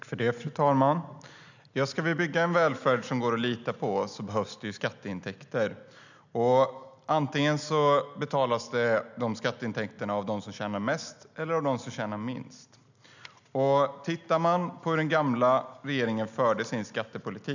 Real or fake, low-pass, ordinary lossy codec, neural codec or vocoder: real; 7.2 kHz; none; none